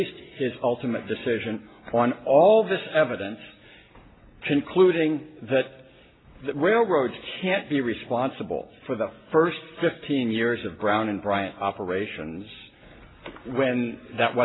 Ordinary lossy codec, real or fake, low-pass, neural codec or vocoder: AAC, 16 kbps; real; 7.2 kHz; none